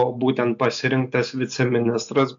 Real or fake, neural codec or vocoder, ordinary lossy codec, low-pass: real; none; AAC, 64 kbps; 7.2 kHz